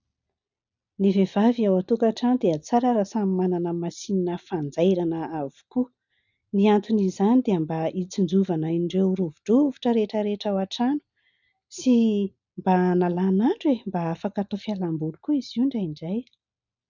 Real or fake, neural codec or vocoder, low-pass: real; none; 7.2 kHz